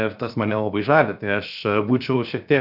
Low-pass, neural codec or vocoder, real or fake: 5.4 kHz; codec, 16 kHz, 0.7 kbps, FocalCodec; fake